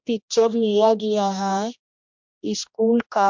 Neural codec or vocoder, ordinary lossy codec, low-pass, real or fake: codec, 16 kHz, 1 kbps, X-Codec, HuBERT features, trained on general audio; MP3, 64 kbps; 7.2 kHz; fake